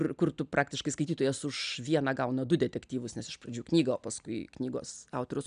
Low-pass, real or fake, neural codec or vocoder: 9.9 kHz; real; none